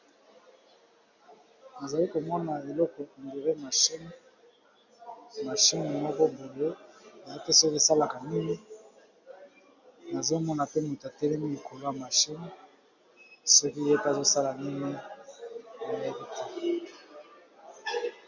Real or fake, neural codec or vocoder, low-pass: real; none; 7.2 kHz